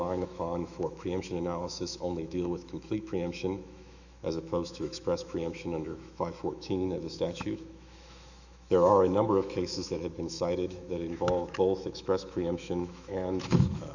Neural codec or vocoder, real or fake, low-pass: autoencoder, 48 kHz, 128 numbers a frame, DAC-VAE, trained on Japanese speech; fake; 7.2 kHz